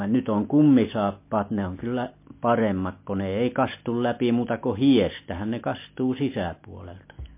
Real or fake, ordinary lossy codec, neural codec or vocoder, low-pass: real; MP3, 24 kbps; none; 3.6 kHz